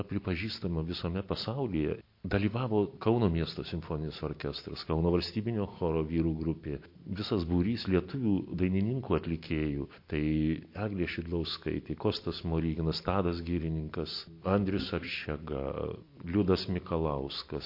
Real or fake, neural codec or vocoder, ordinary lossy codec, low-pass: real; none; MP3, 32 kbps; 5.4 kHz